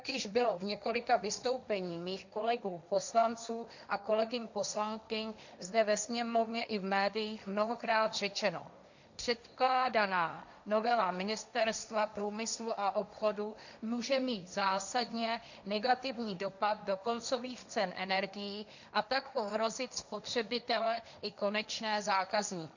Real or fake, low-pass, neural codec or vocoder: fake; 7.2 kHz; codec, 16 kHz, 1.1 kbps, Voila-Tokenizer